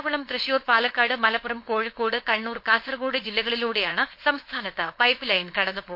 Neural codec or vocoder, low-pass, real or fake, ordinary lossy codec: codec, 16 kHz, 4.8 kbps, FACodec; 5.4 kHz; fake; MP3, 32 kbps